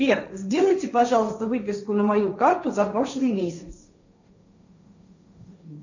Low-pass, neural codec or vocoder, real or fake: 7.2 kHz; codec, 16 kHz, 1.1 kbps, Voila-Tokenizer; fake